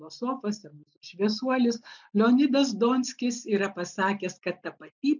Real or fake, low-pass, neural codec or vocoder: real; 7.2 kHz; none